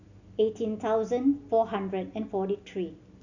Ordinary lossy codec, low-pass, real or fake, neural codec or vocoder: none; 7.2 kHz; real; none